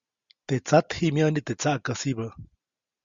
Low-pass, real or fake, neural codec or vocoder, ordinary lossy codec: 7.2 kHz; real; none; Opus, 64 kbps